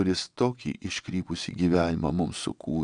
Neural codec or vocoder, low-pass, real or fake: vocoder, 22.05 kHz, 80 mel bands, WaveNeXt; 9.9 kHz; fake